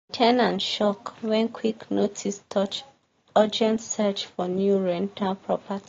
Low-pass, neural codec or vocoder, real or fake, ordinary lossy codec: 7.2 kHz; none; real; AAC, 32 kbps